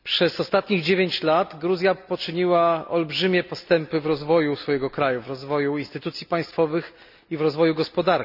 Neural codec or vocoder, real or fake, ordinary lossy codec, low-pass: none; real; none; 5.4 kHz